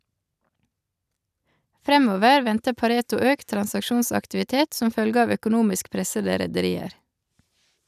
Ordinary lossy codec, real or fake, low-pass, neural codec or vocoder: none; real; 14.4 kHz; none